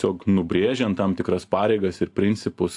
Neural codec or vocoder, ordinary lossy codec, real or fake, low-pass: none; AAC, 64 kbps; real; 10.8 kHz